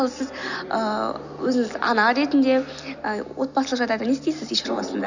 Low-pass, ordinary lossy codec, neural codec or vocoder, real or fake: 7.2 kHz; none; none; real